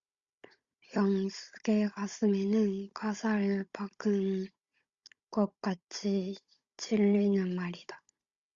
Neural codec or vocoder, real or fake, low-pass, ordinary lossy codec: codec, 16 kHz, 16 kbps, FunCodec, trained on Chinese and English, 50 frames a second; fake; 7.2 kHz; Opus, 64 kbps